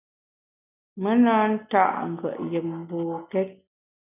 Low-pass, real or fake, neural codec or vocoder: 3.6 kHz; real; none